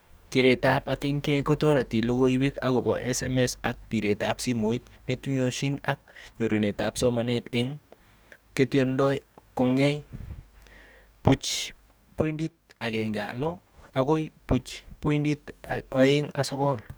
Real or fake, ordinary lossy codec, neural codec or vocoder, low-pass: fake; none; codec, 44.1 kHz, 2.6 kbps, DAC; none